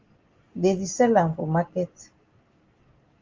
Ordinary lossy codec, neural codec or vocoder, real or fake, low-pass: Opus, 32 kbps; none; real; 7.2 kHz